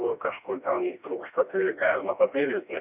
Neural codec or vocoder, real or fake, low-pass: codec, 16 kHz, 1 kbps, FreqCodec, smaller model; fake; 3.6 kHz